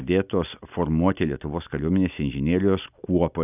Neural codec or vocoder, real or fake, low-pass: none; real; 3.6 kHz